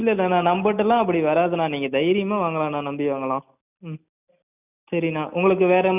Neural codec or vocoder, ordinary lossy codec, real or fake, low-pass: none; none; real; 3.6 kHz